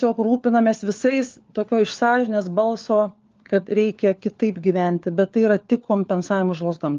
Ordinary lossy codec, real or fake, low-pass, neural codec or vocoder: Opus, 32 kbps; fake; 7.2 kHz; codec, 16 kHz, 4 kbps, FunCodec, trained on LibriTTS, 50 frames a second